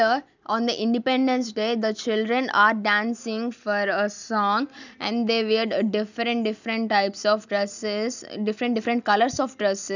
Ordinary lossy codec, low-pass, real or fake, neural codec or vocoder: none; 7.2 kHz; real; none